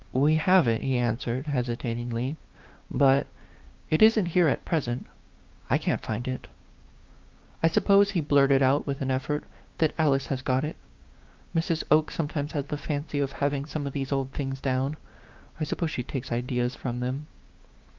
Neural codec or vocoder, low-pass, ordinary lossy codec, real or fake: codec, 16 kHz, 6 kbps, DAC; 7.2 kHz; Opus, 24 kbps; fake